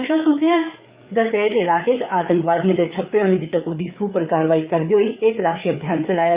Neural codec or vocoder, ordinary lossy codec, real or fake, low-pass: codec, 16 kHz, 4 kbps, FreqCodec, larger model; Opus, 64 kbps; fake; 3.6 kHz